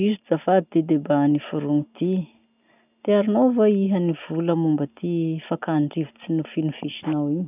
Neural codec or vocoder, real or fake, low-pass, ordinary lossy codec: none; real; 3.6 kHz; none